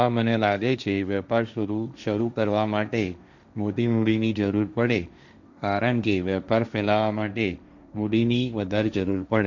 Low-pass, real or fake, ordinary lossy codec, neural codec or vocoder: 7.2 kHz; fake; none; codec, 16 kHz, 1.1 kbps, Voila-Tokenizer